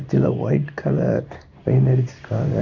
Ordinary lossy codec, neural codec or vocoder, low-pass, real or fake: none; codec, 16 kHz in and 24 kHz out, 1 kbps, XY-Tokenizer; 7.2 kHz; fake